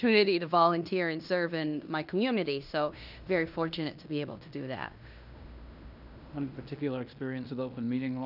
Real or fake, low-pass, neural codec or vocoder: fake; 5.4 kHz; codec, 16 kHz in and 24 kHz out, 0.9 kbps, LongCat-Audio-Codec, fine tuned four codebook decoder